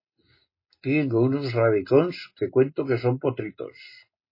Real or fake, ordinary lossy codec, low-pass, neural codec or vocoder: real; MP3, 24 kbps; 5.4 kHz; none